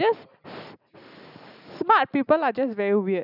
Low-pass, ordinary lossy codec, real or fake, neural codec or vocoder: 5.4 kHz; none; real; none